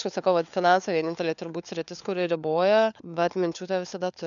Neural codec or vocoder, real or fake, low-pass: codec, 16 kHz, 4 kbps, FunCodec, trained on LibriTTS, 50 frames a second; fake; 7.2 kHz